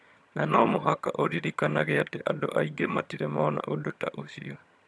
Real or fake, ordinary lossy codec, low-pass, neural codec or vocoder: fake; none; none; vocoder, 22.05 kHz, 80 mel bands, HiFi-GAN